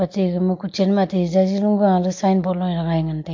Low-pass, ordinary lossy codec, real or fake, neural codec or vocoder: 7.2 kHz; MP3, 48 kbps; real; none